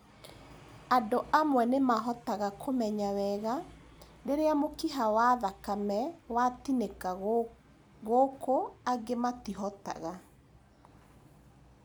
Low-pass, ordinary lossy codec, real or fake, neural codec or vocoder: none; none; real; none